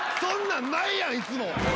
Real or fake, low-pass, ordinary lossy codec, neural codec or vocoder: real; none; none; none